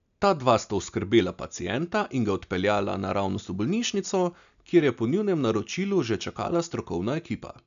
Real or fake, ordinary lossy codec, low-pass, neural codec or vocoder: real; none; 7.2 kHz; none